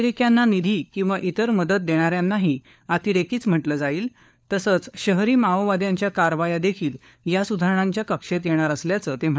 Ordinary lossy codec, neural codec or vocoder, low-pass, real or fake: none; codec, 16 kHz, 4 kbps, FunCodec, trained on LibriTTS, 50 frames a second; none; fake